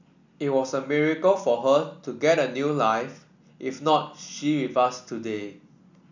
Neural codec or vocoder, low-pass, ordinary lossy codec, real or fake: none; 7.2 kHz; none; real